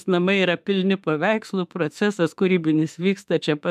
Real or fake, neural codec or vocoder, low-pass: fake; autoencoder, 48 kHz, 32 numbers a frame, DAC-VAE, trained on Japanese speech; 14.4 kHz